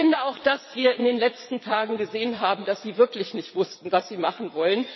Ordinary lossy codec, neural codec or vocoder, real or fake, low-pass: MP3, 24 kbps; vocoder, 22.05 kHz, 80 mel bands, WaveNeXt; fake; 7.2 kHz